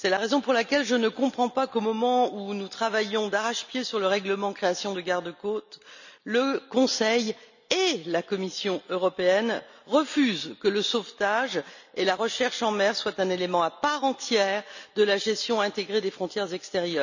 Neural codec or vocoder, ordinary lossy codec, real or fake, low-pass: none; none; real; 7.2 kHz